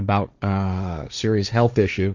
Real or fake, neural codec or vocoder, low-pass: fake; codec, 16 kHz, 1.1 kbps, Voila-Tokenizer; 7.2 kHz